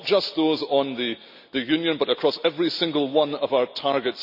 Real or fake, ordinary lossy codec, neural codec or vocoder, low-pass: real; none; none; 5.4 kHz